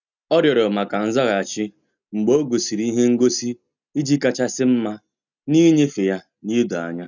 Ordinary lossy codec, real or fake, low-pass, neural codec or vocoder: none; real; 7.2 kHz; none